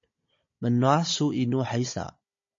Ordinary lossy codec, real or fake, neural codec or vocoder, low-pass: MP3, 32 kbps; fake; codec, 16 kHz, 16 kbps, FunCodec, trained on Chinese and English, 50 frames a second; 7.2 kHz